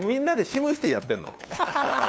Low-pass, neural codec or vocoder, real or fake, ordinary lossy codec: none; codec, 16 kHz, 2 kbps, FunCodec, trained on LibriTTS, 25 frames a second; fake; none